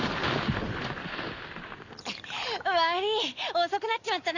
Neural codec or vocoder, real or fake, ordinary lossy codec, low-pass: none; real; none; 7.2 kHz